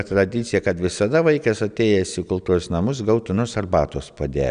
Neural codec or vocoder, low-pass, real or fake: none; 9.9 kHz; real